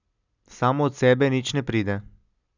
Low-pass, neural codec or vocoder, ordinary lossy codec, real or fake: 7.2 kHz; none; none; real